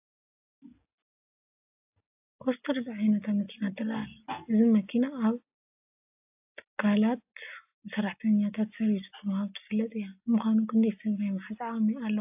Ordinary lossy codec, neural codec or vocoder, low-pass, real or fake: AAC, 32 kbps; none; 3.6 kHz; real